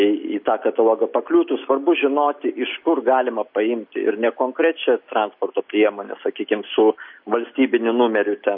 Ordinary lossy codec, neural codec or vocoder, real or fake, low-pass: MP3, 32 kbps; none; real; 5.4 kHz